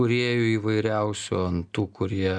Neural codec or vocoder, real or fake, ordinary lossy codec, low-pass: none; real; MP3, 96 kbps; 9.9 kHz